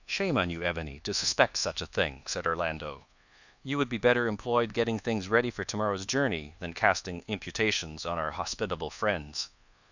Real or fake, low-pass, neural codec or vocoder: fake; 7.2 kHz; codec, 24 kHz, 1.2 kbps, DualCodec